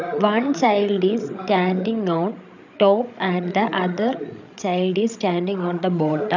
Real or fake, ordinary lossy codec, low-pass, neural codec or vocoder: fake; none; 7.2 kHz; codec, 16 kHz, 16 kbps, FreqCodec, larger model